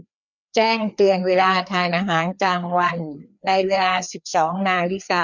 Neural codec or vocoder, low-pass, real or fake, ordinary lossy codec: codec, 16 kHz, 2 kbps, FreqCodec, larger model; 7.2 kHz; fake; none